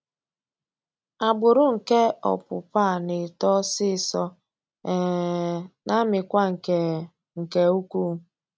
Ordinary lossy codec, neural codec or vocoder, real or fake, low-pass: none; none; real; none